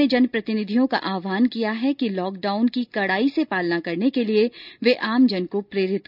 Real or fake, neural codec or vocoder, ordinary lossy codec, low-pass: real; none; none; 5.4 kHz